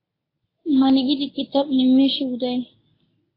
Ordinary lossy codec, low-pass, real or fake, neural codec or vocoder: AAC, 24 kbps; 5.4 kHz; fake; codec, 24 kHz, 0.9 kbps, WavTokenizer, medium speech release version 1